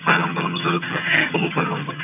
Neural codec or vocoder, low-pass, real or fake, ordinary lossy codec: vocoder, 22.05 kHz, 80 mel bands, HiFi-GAN; 3.6 kHz; fake; none